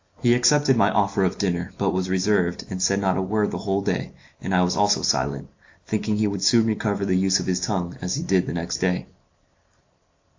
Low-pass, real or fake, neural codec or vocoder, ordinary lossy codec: 7.2 kHz; real; none; AAC, 48 kbps